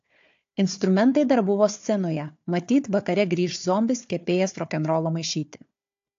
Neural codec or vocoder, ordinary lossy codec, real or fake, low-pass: codec, 16 kHz, 4 kbps, FunCodec, trained on Chinese and English, 50 frames a second; AAC, 48 kbps; fake; 7.2 kHz